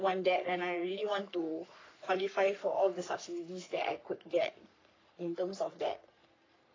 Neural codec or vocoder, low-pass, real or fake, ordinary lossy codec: codec, 44.1 kHz, 3.4 kbps, Pupu-Codec; 7.2 kHz; fake; AAC, 32 kbps